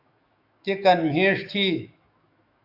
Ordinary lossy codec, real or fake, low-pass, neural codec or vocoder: Opus, 64 kbps; fake; 5.4 kHz; autoencoder, 48 kHz, 128 numbers a frame, DAC-VAE, trained on Japanese speech